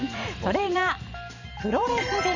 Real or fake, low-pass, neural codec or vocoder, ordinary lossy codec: fake; 7.2 kHz; vocoder, 44.1 kHz, 128 mel bands every 512 samples, BigVGAN v2; none